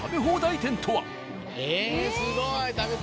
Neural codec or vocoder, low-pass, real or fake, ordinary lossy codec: none; none; real; none